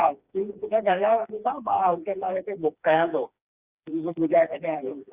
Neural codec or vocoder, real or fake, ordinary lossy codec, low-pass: codec, 16 kHz, 2 kbps, FreqCodec, smaller model; fake; Opus, 64 kbps; 3.6 kHz